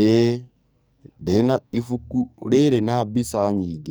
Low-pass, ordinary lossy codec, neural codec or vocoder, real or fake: none; none; codec, 44.1 kHz, 2.6 kbps, SNAC; fake